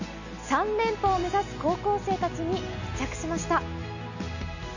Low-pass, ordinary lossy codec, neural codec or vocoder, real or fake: 7.2 kHz; none; none; real